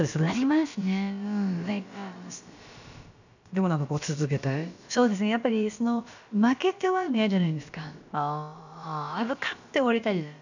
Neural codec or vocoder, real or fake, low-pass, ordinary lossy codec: codec, 16 kHz, about 1 kbps, DyCAST, with the encoder's durations; fake; 7.2 kHz; none